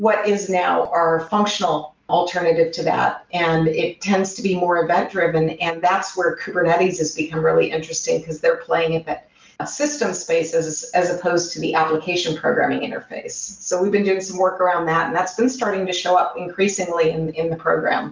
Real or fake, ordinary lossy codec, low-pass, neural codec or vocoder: real; Opus, 24 kbps; 7.2 kHz; none